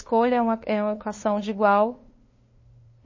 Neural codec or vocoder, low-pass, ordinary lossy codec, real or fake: codec, 16 kHz, 2 kbps, FunCodec, trained on LibriTTS, 25 frames a second; 7.2 kHz; MP3, 32 kbps; fake